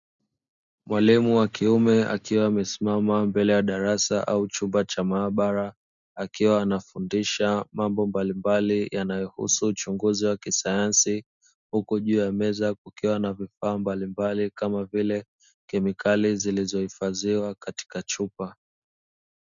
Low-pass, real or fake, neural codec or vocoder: 7.2 kHz; real; none